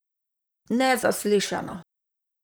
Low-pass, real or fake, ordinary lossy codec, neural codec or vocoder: none; fake; none; vocoder, 44.1 kHz, 128 mel bands, Pupu-Vocoder